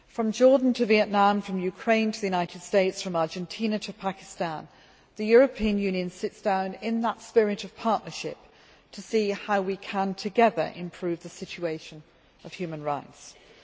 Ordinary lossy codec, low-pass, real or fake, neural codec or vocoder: none; none; real; none